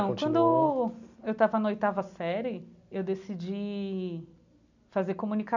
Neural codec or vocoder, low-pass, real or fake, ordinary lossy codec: none; 7.2 kHz; real; none